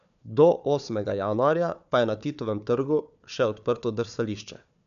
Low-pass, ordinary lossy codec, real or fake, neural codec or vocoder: 7.2 kHz; none; fake; codec, 16 kHz, 4 kbps, FunCodec, trained on Chinese and English, 50 frames a second